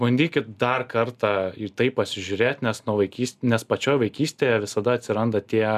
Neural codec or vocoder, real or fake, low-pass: none; real; 14.4 kHz